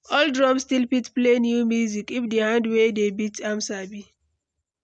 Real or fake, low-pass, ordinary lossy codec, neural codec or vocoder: real; 9.9 kHz; none; none